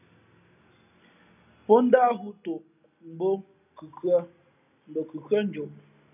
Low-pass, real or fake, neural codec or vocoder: 3.6 kHz; real; none